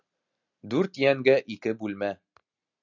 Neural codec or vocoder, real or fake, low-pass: none; real; 7.2 kHz